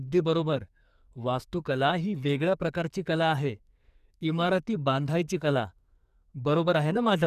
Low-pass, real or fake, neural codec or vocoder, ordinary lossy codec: 14.4 kHz; fake; codec, 44.1 kHz, 2.6 kbps, SNAC; none